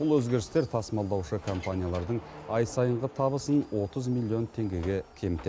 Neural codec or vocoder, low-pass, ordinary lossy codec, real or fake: none; none; none; real